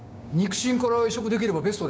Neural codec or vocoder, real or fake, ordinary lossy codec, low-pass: codec, 16 kHz, 6 kbps, DAC; fake; none; none